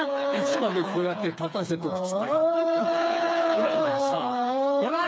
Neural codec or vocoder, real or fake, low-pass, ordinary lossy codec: codec, 16 kHz, 4 kbps, FreqCodec, smaller model; fake; none; none